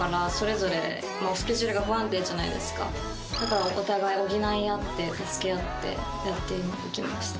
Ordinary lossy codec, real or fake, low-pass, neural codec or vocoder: none; real; none; none